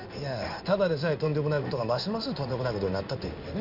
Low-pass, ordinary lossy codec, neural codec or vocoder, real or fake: 5.4 kHz; none; codec, 16 kHz in and 24 kHz out, 1 kbps, XY-Tokenizer; fake